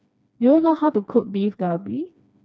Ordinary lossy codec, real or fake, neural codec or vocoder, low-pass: none; fake; codec, 16 kHz, 2 kbps, FreqCodec, smaller model; none